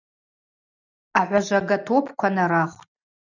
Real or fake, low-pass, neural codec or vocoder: real; 7.2 kHz; none